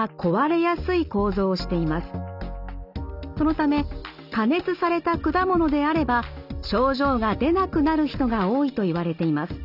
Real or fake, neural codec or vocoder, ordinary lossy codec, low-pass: real; none; none; 5.4 kHz